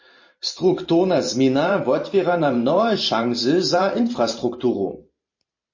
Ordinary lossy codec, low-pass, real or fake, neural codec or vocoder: MP3, 32 kbps; 7.2 kHz; fake; vocoder, 44.1 kHz, 128 mel bands every 512 samples, BigVGAN v2